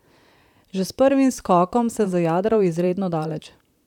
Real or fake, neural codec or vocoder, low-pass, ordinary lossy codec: fake; vocoder, 44.1 kHz, 128 mel bands, Pupu-Vocoder; 19.8 kHz; none